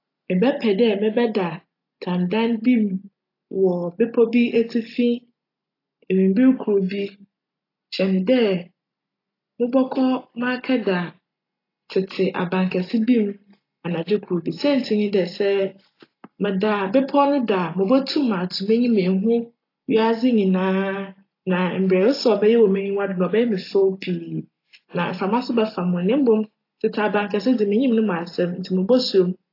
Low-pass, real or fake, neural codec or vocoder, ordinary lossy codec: 5.4 kHz; real; none; AAC, 32 kbps